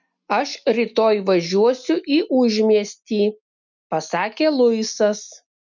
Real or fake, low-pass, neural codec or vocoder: real; 7.2 kHz; none